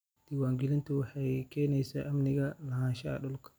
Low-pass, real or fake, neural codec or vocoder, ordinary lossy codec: none; real; none; none